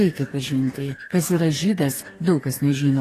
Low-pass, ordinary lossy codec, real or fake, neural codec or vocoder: 14.4 kHz; AAC, 48 kbps; fake; codec, 44.1 kHz, 2.6 kbps, DAC